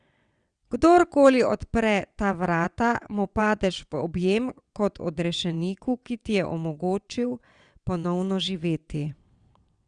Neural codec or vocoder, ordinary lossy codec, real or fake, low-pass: vocoder, 22.05 kHz, 80 mel bands, WaveNeXt; Opus, 64 kbps; fake; 9.9 kHz